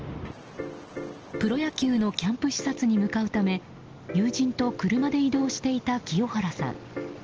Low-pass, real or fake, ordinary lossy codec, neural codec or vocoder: 7.2 kHz; real; Opus, 16 kbps; none